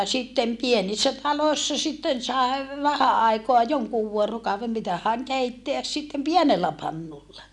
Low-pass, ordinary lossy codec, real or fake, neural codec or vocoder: none; none; real; none